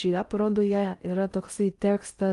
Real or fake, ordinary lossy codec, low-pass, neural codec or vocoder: fake; Opus, 32 kbps; 10.8 kHz; codec, 16 kHz in and 24 kHz out, 0.6 kbps, FocalCodec, streaming, 2048 codes